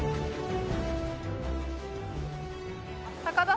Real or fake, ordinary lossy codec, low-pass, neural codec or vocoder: real; none; none; none